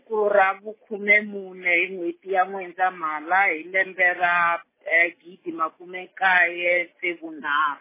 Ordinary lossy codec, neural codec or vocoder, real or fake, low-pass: MP3, 16 kbps; none; real; 3.6 kHz